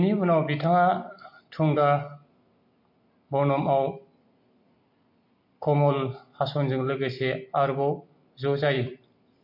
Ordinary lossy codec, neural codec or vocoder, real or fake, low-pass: MP3, 32 kbps; none; real; 5.4 kHz